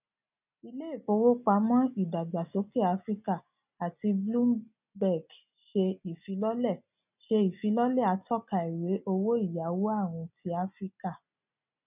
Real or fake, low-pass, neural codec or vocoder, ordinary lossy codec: real; 3.6 kHz; none; none